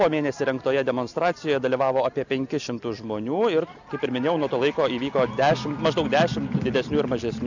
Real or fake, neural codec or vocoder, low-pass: real; none; 7.2 kHz